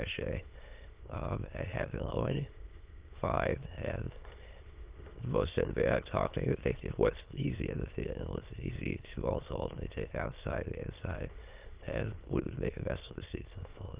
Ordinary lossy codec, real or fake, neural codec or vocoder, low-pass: Opus, 32 kbps; fake; autoencoder, 22.05 kHz, a latent of 192 numbers a frame, VITS, trained on many speakers; 3.6 kHz